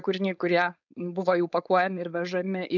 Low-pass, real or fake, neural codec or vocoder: 7.2 kHz; fake; codec, 16 kHz, 4.8 kbps, FACodec